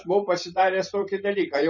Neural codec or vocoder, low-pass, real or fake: vocoder, 44.1 kHz, 128 mel bands every 512 samples, BigVGAN v2; 7.2 kHz; fake